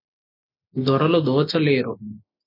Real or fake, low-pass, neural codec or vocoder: real; 5.4 kHz; none